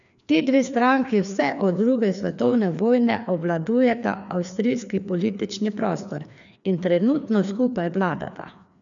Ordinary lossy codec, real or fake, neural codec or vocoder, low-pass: none; fake; codec, 16 kHz, 2 kbps, FreqCodec, larger model; 7.2 kHz